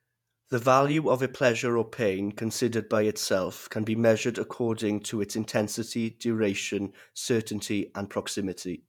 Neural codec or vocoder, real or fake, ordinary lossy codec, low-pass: vocoder, 48 kHz, 128 mel bands, Vocos; fake; none; 19.8 kHz